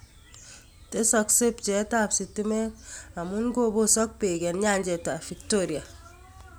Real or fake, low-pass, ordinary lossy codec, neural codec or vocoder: real; none; none; none